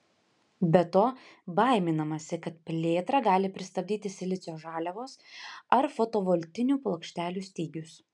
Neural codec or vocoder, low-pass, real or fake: none; 10.8 kHz; real